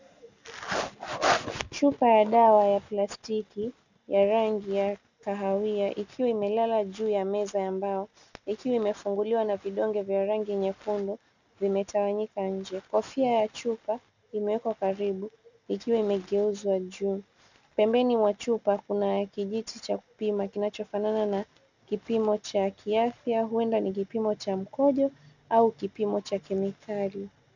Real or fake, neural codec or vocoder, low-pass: real; none; 7.2 kHz